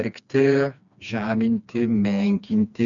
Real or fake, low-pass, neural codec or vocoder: fake; 7.2 kHz; codec, 16 kHz, 2 kbps, FreqCodec, smaller model